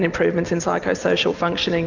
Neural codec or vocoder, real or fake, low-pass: none; real; 7.2 kHz